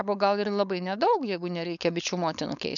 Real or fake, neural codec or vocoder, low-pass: fake; codec, 16 kHz, 4.8 kbps, FACodec; 7.2 kHz